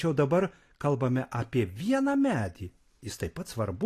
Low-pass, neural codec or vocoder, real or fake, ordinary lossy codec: 14.4 kHz; none; real; AAC, 48 kbps